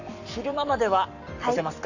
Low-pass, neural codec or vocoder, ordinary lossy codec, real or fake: 7.2 kHz; codec, 44.1 kHz, 7.8 kbps, Pupu-Codec; none; fake